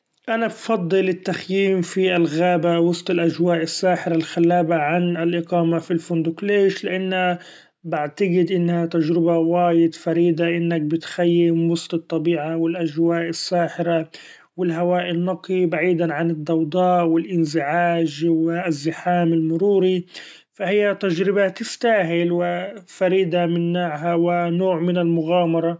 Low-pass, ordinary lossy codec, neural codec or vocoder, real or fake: none; none; none; real